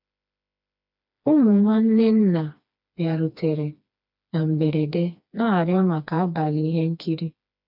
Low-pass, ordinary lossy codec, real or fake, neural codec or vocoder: 5.4 kHz; none; fake; codec, 16 kHz, 2 kbps, FreqCodec, smaller model